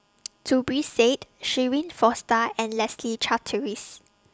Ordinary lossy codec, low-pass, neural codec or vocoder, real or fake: none; none; none; real